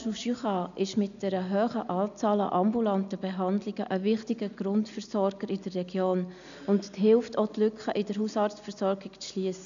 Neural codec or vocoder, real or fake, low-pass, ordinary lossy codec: none; real; 7.2 kHz; none